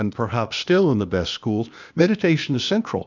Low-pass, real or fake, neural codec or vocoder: 7.2 kHz; fake; codec, 16 kHz, 0.8 kbps, ZipCodec